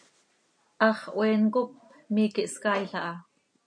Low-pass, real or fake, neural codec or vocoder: 9.9 kHz; real; none